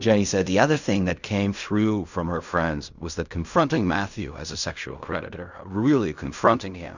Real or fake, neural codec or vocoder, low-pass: fake; codec, 16 kHz in and 24 kHz out, 0.4 kbps, LongCat-Audio-Codec, fine tuned four codebook decoder; 7.2 kHz